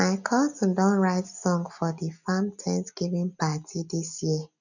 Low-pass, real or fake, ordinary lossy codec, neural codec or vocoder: 7.2 kHz; real; none; none